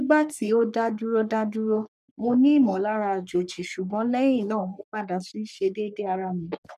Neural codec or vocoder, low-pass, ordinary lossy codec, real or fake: codec, 44.1 kHz, 3.4 kbps, Pupu-Codec; 14.4 kHz; none; fake